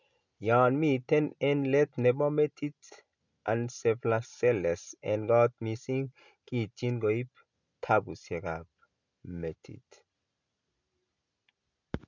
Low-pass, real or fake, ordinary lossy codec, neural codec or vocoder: 7.2 kHz; real; none; none